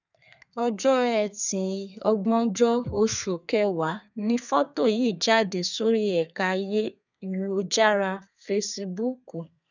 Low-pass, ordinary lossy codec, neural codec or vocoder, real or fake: 7.2 kHz; none; codec, 32 kHz, 1.9 kbps, SNAC; fake